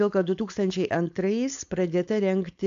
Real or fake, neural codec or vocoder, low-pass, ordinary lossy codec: fake; codec, 16 kHz, 4.8 kbps, FACodec; 7.2 kHz; AAC, 64 kbps